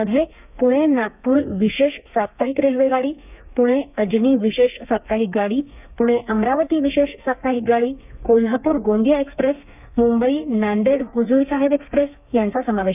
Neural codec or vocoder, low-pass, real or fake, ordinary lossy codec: codec, 32 kHz, 1.9 kbps, SNAC; 3.6 kHz; fake; none